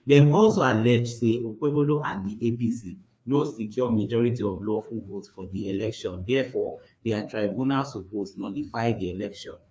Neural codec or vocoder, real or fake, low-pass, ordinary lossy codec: codec, 16 kHz, 2 kbps, FreqCodec, larger model; fake; none; none